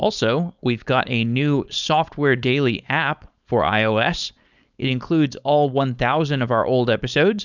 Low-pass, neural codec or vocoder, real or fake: 7.2 kHz; codec, 16 kHz, 4.8 kbps, FACodec; fake